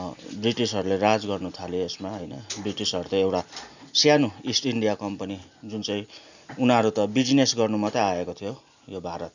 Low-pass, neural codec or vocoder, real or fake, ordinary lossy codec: 7.2 kHz; none; real; none